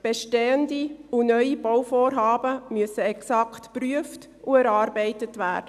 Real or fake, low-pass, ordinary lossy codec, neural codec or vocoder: real; 14.4 kHz; none; none